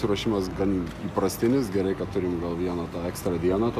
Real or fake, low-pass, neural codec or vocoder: real; 14.4 kHz; none